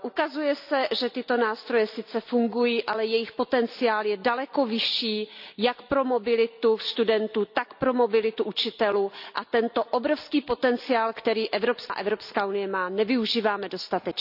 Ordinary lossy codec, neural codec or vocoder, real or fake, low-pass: none; none; real; 5.4 kHz